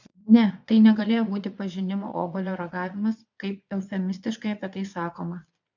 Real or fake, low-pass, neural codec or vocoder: fake; 7.2 kHz; vocoder, 22.05 kHz, 80 mel bands, Vocos